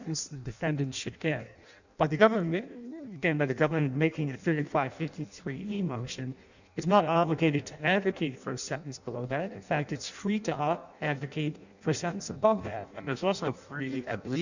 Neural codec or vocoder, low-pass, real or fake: codec, 16 kHz in and 24 kHz out, 0.6 kbps, FireRedTTS-2 codec; 7.2 kHz; fake